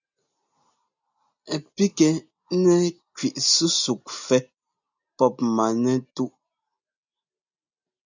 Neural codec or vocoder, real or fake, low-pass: none; real; 7.2 kHz